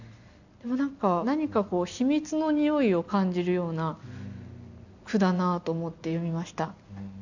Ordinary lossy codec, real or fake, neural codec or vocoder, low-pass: none; real; none; 7.2 kHz